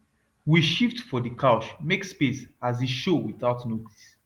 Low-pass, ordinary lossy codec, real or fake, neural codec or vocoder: 19.8 kHz; Opus, 24 kbps; real; none